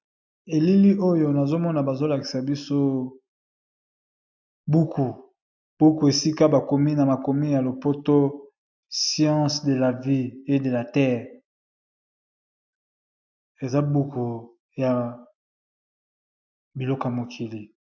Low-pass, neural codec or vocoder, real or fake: 7.2 kHz; none; real